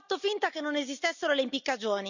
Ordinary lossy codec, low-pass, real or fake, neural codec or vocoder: none; 7.2 kHz; real; none